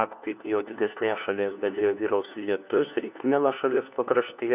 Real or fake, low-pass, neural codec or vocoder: fake; 3.6 kHz; codec, 16 kHz, 1 kbps, FunCodec, trained on LibriTTS, 50 frames a second